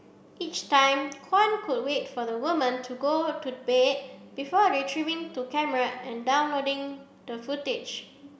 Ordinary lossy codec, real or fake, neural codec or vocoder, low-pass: none; real; none; none